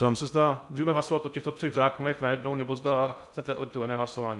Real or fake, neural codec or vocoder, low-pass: fake; codec, 16 kHz in and 24 kHz out, 0.6 kbps, FocalCodec, streaming, 2048 codes; 10.8 kHz